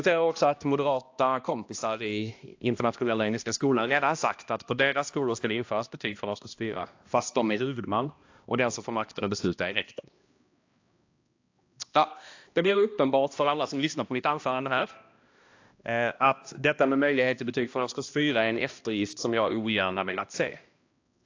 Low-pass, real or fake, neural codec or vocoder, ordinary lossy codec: 7.2 kHz; fake; codec, 16 kHz, 1 kbps, X-Codec, HuBERT features, trained on balanced general audio; AAC, 48 kbps